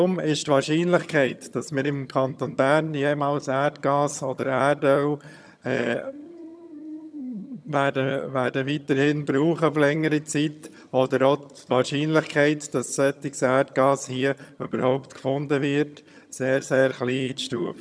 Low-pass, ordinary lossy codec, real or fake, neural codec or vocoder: none; none; fake; vocoder, 22.05 kHz, 80 mel bands, HiFi-GAN